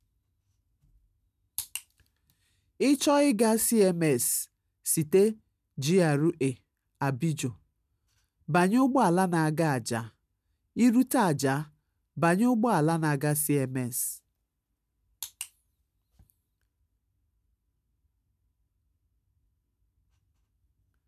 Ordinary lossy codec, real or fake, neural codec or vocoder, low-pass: none; real; none; 14.4 kHz